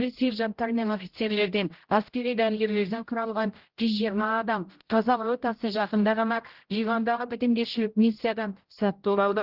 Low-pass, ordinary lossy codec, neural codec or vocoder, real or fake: 5.4 kHz; Opus, 16 kbps; codec, 16 kHz, 0.5 kbps, X-Codec, HuBERT features, trained on general audio; fake